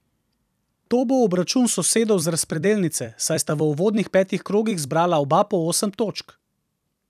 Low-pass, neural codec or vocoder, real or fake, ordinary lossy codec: 14.4 kHz; vocoder, 44.1 kHz, 128 mel bands every 256 samples, BigVGAN v2; fake; none